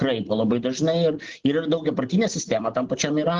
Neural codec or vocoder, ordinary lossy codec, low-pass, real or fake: none; Opus, 16 kbps; 7.2 kHz; real